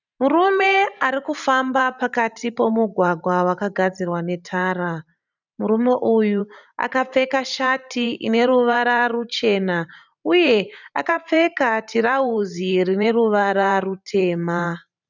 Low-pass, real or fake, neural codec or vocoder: 7.2 kHz; fake; vocoder, 44.1 kHz, 128 mel bands every 512 samples, BigVGAN v2